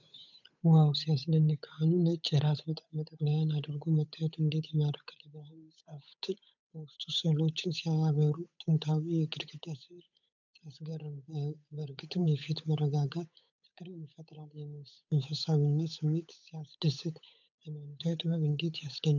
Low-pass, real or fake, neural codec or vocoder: 7.2 kHz; fake; codec, 16 kHz, 8 kbps, FunCodec, trained on Chinese and English, 25 frames a second